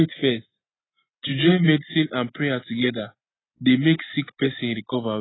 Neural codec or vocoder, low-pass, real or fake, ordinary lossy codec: none; 7.2 kHz; real; AAC, 16 kbps